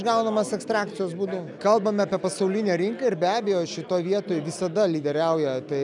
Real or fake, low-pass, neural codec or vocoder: real; 10.8 kHz; none